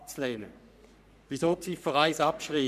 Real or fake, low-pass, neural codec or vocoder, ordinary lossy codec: fake; 14.4 kHz; codec, 44.1 kHz, 3.4 kbps, Pupu-Codec; none